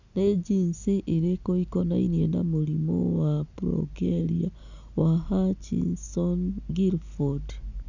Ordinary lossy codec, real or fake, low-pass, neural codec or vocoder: none; real; 7.2 kHz; none